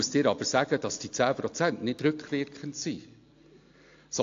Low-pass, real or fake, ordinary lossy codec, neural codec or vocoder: 7.2 kHz; real; AAC, 48 kbps; none